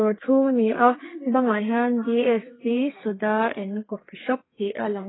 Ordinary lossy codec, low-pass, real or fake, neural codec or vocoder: AAC, 16 kbps; 7.2 kHz; fake; codec, 32 kHz, 1.9 kbps, SNAC